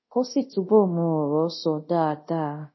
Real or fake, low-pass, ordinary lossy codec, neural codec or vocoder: fake; 7.2 kHz; MP3, 24 kbps; codec, 24 kHz, 0.5 kbps, DualCodec